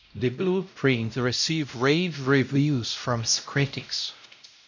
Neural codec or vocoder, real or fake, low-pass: codec, 16 kHz, 0.5 kbps, X-Codec, WavLM features, trained on Multilingual LibriSpeech; fake; 7.2 kHz